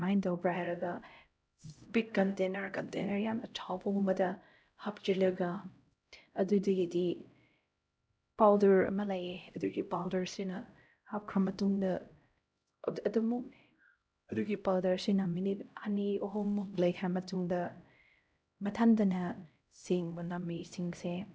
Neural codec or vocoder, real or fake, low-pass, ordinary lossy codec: codec, 16 kHz, 0.5 kbps, X-Codec, HuBERT features, trained on LibriSpeech; fake; none; none